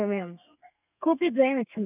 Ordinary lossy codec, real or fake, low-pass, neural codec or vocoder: none; fake; 3.6 kHz; codec, 32 kHz, 1.9 kbps, SNAC